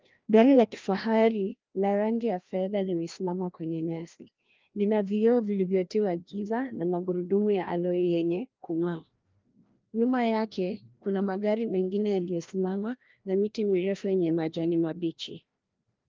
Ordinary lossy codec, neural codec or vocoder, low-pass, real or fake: Opus, 32 kbps; codec, 16 kHz, 1 kbps, FreqCodec, larger model; 7.2 kHz; fake